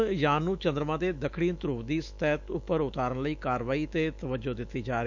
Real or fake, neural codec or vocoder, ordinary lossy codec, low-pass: fake; autoencoder, 48 kHz, 128 numbers a frame, DAC-VAE, trained on Japanese speech; none; 7.2 kHz